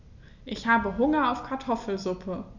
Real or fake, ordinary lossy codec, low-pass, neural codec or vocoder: real; none; 7.2 kHz; none